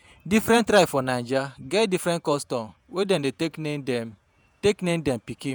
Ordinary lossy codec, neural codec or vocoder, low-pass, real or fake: none; vocoder, 48 kHz, 128 mel bands, Vocos; none; fake